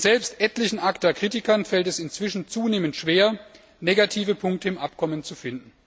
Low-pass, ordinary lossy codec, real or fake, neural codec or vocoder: none; none; real; none